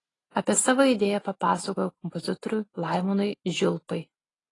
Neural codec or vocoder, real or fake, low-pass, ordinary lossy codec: none; real; 10.8 kHz; AAC, 32 kbps